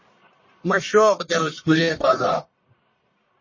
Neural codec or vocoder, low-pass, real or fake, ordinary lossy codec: codec, 44.1 kHz, 1.7 kbps, Pupu-Codec; 7.2 kHz; fake; MP3, 32 kbps